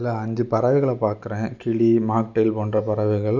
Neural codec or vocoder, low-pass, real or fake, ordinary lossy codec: none; 7.2 kHz; real; none